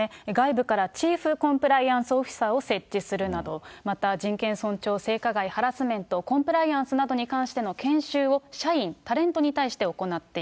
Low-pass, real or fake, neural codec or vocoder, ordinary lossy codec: none; real; none; none